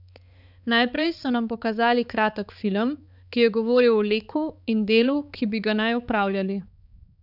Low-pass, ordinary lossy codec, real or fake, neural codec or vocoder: 5.4 kHz; none; fake; codec, 16 kHz, 4 kbps, X-Codec, HuBERT features, trained on balanced general audio